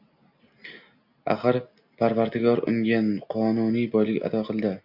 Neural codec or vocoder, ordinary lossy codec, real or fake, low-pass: none; MP3, 48 kbps; real; 5.4 kHz